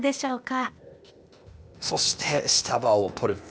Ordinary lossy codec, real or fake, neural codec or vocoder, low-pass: none; fake; codec, 16 kHz, 0.8 kbps, ZipCodec; none